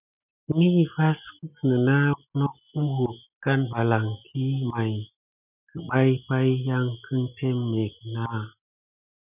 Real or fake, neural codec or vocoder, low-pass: real; none; 3.6 kHz